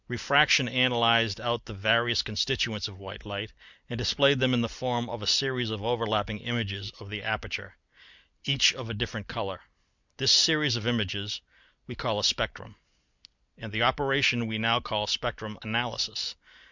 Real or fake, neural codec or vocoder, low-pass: real; none; 7.2 kHz